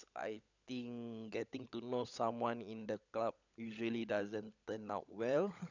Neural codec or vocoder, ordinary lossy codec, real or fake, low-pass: codec, 16 kHz, 16 kbps, FunCodec, trained on LibriTTS, 50 frames a second; none; fake; 7.2 kHz